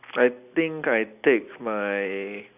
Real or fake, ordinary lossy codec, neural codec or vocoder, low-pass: real; none; none; 3.6 kHz